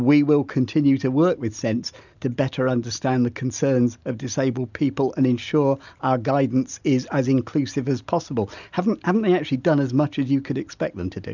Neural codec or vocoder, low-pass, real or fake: none; 7.2 kHz; real